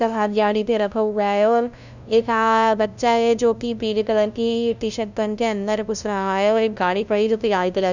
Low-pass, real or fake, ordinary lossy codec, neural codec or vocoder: 7.2 kHz; fake; none; codec, 16 kHz, 0.5 kbps, FunCodec, trained on LibriTTS, 25 frames a second